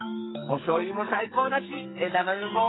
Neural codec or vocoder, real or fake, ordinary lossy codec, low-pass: codec, 44.1 kHz, 2.6 kbps, SNAC; fake; AAC, 16 kbps; 7.2 kHz